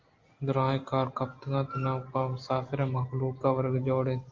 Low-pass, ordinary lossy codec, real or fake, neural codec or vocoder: 7.2 kHz; Opus, 32 kbps; real; none